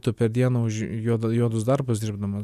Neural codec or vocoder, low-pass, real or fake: none; 14.4 kHz; real